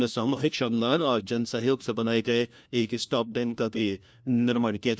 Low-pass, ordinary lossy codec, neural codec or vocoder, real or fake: none; none; codec, 16 kHz, 1 kbps, FunCodec, trained on LibriTTS, 50 frames a second; fake